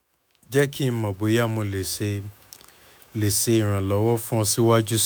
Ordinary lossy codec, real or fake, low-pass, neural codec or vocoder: none; fake; none; autoencoder, 48 kHz, 128 numbers a frame, DAC-VAE, trained on Japanese speech